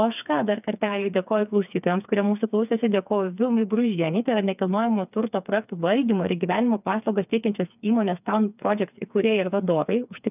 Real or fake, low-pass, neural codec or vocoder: fake; 3.6 kHz; codec, 16 kHz, 4 kbps, FreqCodec, smaller model